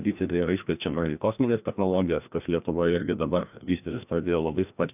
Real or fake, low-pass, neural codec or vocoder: fake; 3.6 kHz; codec, 16 kHz, 1 kbps, FreqCodec, larger model